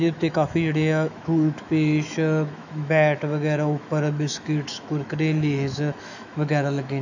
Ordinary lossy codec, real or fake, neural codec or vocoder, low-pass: none; fake; autoencoder, 48 kHz, 128 numbers a frame, DAC-VAE, trained on Japanese speech; 7.2 kHz